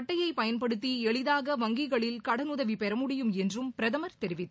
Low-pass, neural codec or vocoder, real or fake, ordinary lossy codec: none; none; real; none